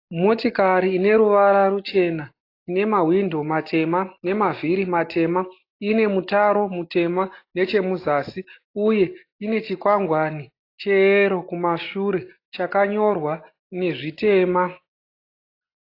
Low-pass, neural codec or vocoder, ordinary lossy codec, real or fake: 5.4 kHz; none; AAC, 32 kbps; real